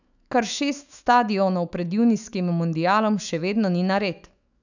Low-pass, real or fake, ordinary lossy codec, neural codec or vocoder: 7.2 kHz; fake; none; autoencoder, 48 kHz, 128 numbers a frame, DAC-VAE, trained on Japanese speech